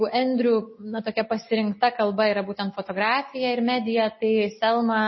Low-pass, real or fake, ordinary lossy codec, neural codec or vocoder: 7.2 kHz; real; MP3, 24 kbps; none